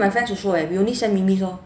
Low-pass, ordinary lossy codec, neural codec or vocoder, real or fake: none; none; none; real